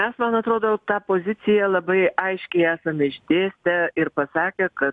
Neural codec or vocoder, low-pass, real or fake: none; 10.8 kHz; real